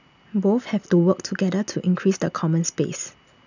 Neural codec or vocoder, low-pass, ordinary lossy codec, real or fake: none; 7.2 kHz; none; real